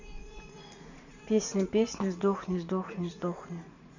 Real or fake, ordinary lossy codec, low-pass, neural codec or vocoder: fake; none; 7.2 kHz; vocoder, 44.1 kHz, 80 mel bands, Vocos